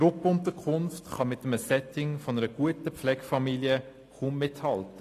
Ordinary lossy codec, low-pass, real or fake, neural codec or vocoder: AAC, 48 kbps; 14.4 kHz; real; none